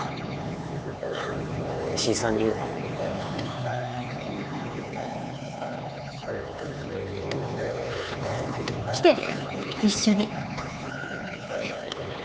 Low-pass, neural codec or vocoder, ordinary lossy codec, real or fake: none; codec, 16 kHz, 4 kbps, X-Codec, HuBERT features, trained on LibriSpeech; none; fake